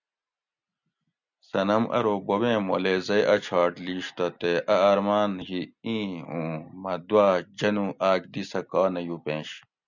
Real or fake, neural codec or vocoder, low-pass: real; none; 7.2 kHz